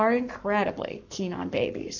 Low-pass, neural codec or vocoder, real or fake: 7.2 kHz; codec, 44.1 kHz, 7.8 kbps, Pupu-Codec; fake